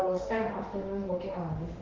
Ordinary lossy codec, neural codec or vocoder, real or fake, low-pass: Opus, 16 kbps; autoencoder, 48 kHz, 32 numbers a frame, DAC-VAE, trained on Japanese speech; fake; 7.2 kHz